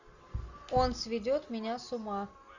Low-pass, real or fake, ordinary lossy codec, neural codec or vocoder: 7.2 kHz; real; AAC, 48 kbps; none